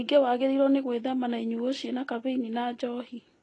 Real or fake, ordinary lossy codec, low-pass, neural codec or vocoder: real; AAC, 32 kbps; 10.8 kHz; none